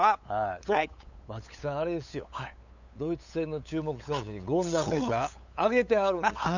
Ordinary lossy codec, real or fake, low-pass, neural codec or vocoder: none; fake; 7.2 kHz; codec, 16 kHz, 8 kbps, FunCodec, trained on LibriTTS, 25 frames a second